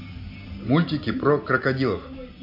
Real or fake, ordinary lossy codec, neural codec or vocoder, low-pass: real; none; none; 5.4 kHz